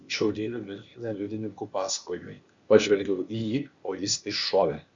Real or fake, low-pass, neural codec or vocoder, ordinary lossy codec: fake; 7.2 kHz; codec, 16 kHz, 0.8 kbps, ZipCodec; Opus, 64 kbps